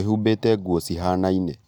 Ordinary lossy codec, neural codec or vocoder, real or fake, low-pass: none; none; real; 19.8 kHz